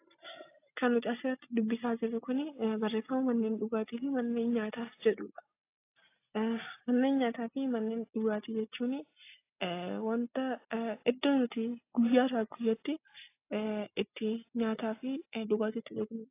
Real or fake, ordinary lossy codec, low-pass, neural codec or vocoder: real; AAC, 24 kbps; 3.6 kHz; none